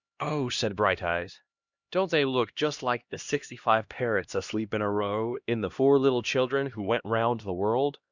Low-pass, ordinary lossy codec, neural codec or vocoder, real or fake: 7.2 kHz; Opus, 64 kbps; codec, 16 kHz, 1 kbps, X-Codec, HuBERT features, trained on LibriSpeech; fake